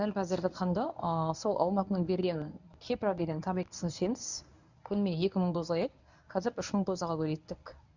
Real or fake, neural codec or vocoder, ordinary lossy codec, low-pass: fake; codec, 24 kHz, 0.9 kbps, WavTokenizer, medium speech release version 1; none; 7.2 kHz